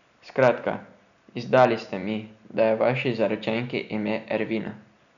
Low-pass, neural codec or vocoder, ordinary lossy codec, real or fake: 7.2 kHz; none; none; real